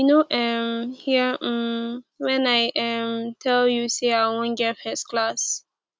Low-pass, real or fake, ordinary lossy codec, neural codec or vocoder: none; real; none; none